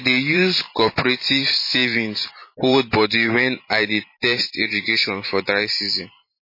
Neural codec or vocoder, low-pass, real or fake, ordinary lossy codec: vocoder, 44.1 kHz, 128 mel bands every 512 samples, BigVGAN v2; 5.4 kHz; fake; MP3, 24 kbps